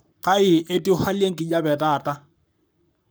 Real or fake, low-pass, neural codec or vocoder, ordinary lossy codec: fake; none; codec, 44.1 kHz, 7.8 kbps, Pupu-Codec; none